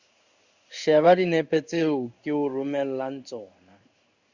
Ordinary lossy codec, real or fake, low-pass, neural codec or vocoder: Opus, 64 kbps; fake; 7.2 kHz; codec, 16 kHz in and 24 kHz out, 1 kbps, XY-Tokenizer